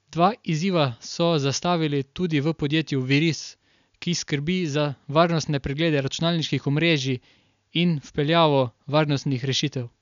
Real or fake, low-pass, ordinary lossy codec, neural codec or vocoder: real; 7.2 kHz; none; none